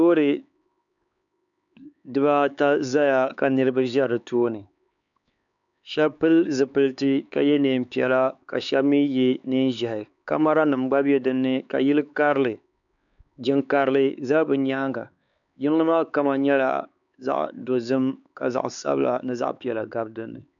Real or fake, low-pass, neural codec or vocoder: fake; 7.2 kHz; codec, 16 kHz, 4 kbps, X-Codec, HuBERT features, trained on LibriSpeech